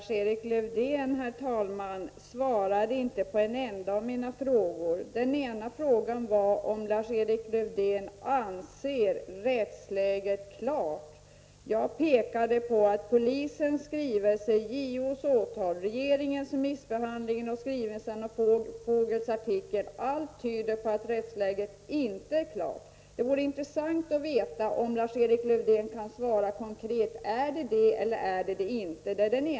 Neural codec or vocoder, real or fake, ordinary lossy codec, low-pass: none; real; none; none